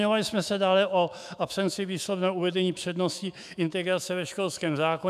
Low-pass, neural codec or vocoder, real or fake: 14.4 kHz; autoencoder, 48 kHz, 128 numbers a frame, DAC-VAE, trained on Japanese speech; fake